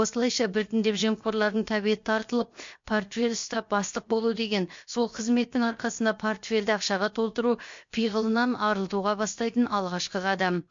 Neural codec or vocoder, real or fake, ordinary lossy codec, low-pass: codec, 16 kHz, 0.7 kbps, FocalCodec; fake; MP3, 48 kbps; 7.2 kHz